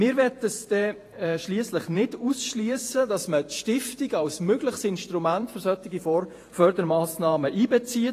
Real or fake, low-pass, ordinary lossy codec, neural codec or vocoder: fake; 14.4 kHz; AAC, 48 kbps; vocoder, 48 kHz, 128 mel bands, Vocos